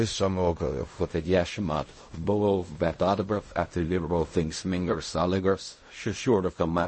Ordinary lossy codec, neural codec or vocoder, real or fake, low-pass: MP3, 32 kbps; codec, 16 kHz in and 24 kHz out, 0.4 kbps, LongCat-Audio-Codec, fine tuned four codebook decoder; fake; 10.8 kHz